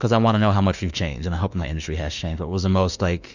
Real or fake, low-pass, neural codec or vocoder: fake; 7.2 kHz; autoencoder, 48 kHz, 32 numbers a frame, DAC-VAE, trained on Japanese speech